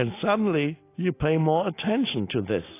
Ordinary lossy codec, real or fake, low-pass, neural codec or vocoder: AAC, 24 kbps; real; 3.6 kHz; none